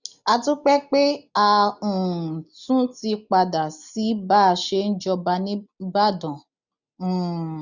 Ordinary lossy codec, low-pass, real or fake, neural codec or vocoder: none; 7.2 kHz; real; none